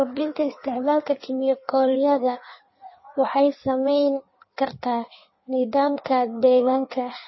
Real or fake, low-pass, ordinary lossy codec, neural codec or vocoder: fake; 7.2 kHz; MP3, 24 kbps; codec, 16 kHz in and 24 kHz out, 1.1 kbps, FireRedTTS-2 codec